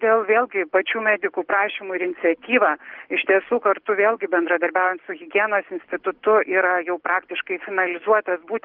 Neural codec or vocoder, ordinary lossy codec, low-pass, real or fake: none; Opus, 16 kbps; 5.4 kHz; real